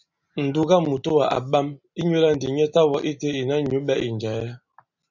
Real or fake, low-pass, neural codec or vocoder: real; 7.2 kHz; none